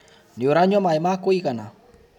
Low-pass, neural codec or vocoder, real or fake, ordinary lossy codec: 19.8 kHz; none; real; none